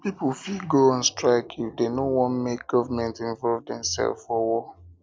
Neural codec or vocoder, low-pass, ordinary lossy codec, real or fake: none; none; none; real